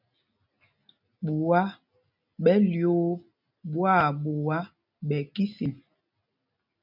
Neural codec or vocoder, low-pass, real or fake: none; 5.4 kHz; real